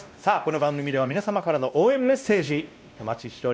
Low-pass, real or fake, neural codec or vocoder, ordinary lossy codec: none; fake; codec, 16 kHz, 1 kbps, X-Codec, WavLM features, trained on Multilingual LibriSpeech; none